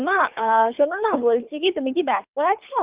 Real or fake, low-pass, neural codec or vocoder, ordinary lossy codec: fake; 3.6 kHz; codec, 24 kHz, 3 kbps, HILCodec; Opus, 24 kbps